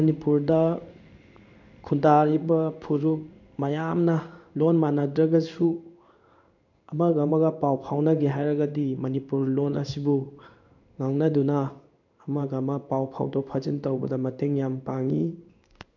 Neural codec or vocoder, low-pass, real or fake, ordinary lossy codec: codec, 16 kHz in and 24 kHz out, 1 kbps, XY-Tokenizer; 7.2 kHz; fake; none